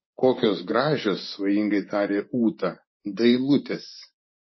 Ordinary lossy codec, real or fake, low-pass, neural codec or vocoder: MP3, 24 kbps; fake; 7.2 kHz; vocoder, 24 kHz, 100 mel bands, Vocos